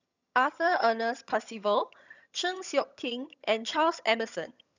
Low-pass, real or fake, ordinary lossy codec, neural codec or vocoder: 7.2 kHz; fake; none; vocoder, 22.05 kHz, 80 mel bands, HiFi-GAN